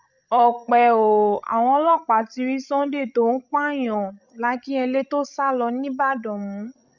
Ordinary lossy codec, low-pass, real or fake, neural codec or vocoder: none; 7.2 kHz; fake; codec, 16 kHz, 16 kbps, FreqCodec, larger model